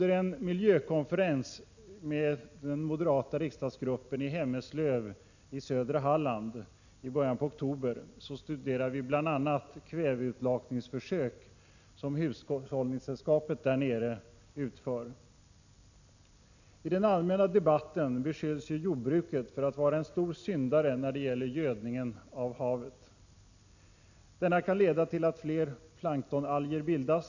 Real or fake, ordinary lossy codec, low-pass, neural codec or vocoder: real; none; 7.2 kHz; none